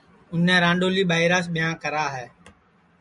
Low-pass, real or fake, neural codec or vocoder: 10.8 kHz; real; none